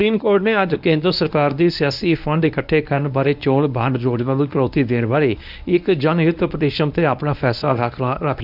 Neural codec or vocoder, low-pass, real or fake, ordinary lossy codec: codec, 24 kHz, 0.9 kbps, WavTokenizer, medium speech release version 1; 5.4 kHz; fake; none